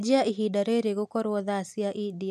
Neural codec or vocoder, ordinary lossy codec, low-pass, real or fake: none; none; 14.4 kHz; real